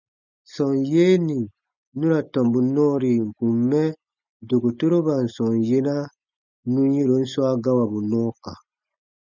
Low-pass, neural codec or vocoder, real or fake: 7.2 kHz; none; real